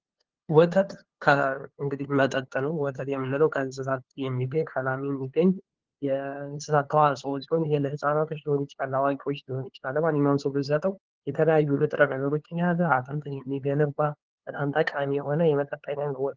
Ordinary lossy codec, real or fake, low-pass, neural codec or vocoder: Opus, 16 kbps; fake; 7.2 kHz; codec, 16 kHz, 2 kbps, FunCodec, trained on LibriTTS, 25 frames a second